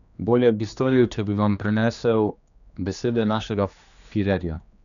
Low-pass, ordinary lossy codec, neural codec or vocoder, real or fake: 7.2 kHz; none; codec, 16 kHz, 2 kbps, X-Codec, HuBERT features, trained on general audio; fake